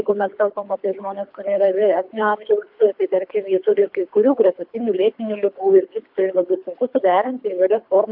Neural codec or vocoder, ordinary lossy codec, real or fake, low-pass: codec, 24 kHz, 3 kbps, HILCodec; AAC, 48 kbps; fake; 5.4 kHz